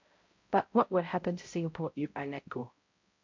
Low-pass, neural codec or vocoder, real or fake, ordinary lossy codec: 7.2 kHz; codec, 16 kHz, 0.5 kbps, X-Codec, HuBERT features, trained on balanced general audio; fake; MP3, 48 kbps